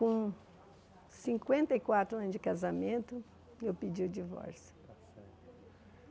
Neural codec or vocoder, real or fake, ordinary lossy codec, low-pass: none; real; none; none